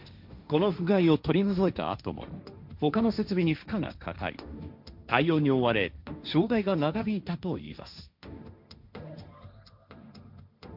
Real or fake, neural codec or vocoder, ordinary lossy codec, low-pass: fake; codec, 16 kHz, 1.1 kbps, Voila-Tokenizer; none; 5.4 kHz